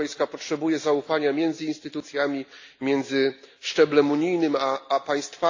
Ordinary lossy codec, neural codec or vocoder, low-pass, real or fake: AAC, 48 kbps; none; 7.2 kHz; real